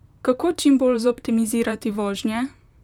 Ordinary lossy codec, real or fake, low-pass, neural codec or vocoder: none; fake; 19.8 kHz; vocoder, 44.1 kHz, 128 mel bands, Pupu-Vocoder